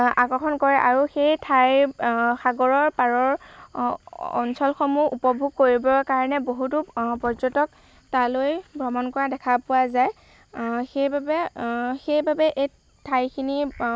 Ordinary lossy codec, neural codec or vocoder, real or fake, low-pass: none; none; real; none